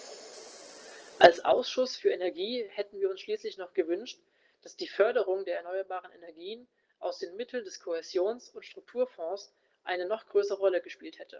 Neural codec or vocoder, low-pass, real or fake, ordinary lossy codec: none; 7.2 kHz; real; Opus, 16 kbps